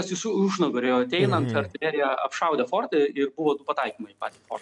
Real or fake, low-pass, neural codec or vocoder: real; 10.8 kHz; none